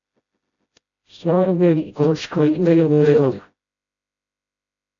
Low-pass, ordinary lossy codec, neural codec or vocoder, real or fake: 7.2 kHz; AAC, 48 kbps; codec, 16 kHz, 0.5 kbps, FreqCodec, smaller model; fake